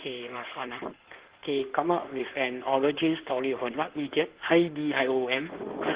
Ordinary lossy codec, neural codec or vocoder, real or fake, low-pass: Opus, 16 kbps; codec, 16 kHz in and 24 kHz out, 2.2 kbps, FireRedTTS-2 codec; fake; 3.6 kHz